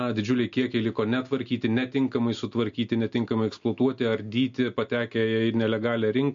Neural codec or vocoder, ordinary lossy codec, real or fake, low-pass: none; MP3, 48 kbps; real; 7.2 kHz